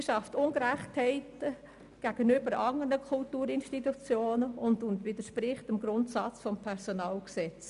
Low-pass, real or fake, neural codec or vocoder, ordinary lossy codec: 10.8 kHz; real; none; none